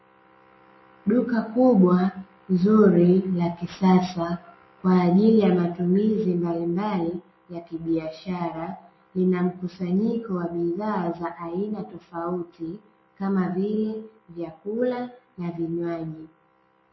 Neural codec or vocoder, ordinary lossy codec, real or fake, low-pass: none; MP3, 24 kbps; real; 7.2 kHz